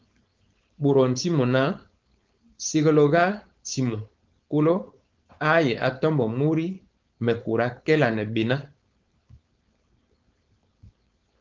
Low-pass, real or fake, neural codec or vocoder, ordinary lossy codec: 7.2 kHz; fake; codec, 16 kHz, 4.8 kbps, FACodec; Opus, 24 kbps